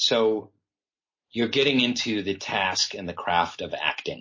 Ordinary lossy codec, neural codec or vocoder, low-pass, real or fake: MP3, 32 kbps; none; 7.2 kHz; real